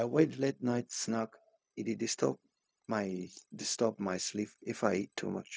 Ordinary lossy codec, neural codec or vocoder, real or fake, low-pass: none; codec, 16 kHz, 0.4 kbps, LongCat-Audio-Codec; fake; none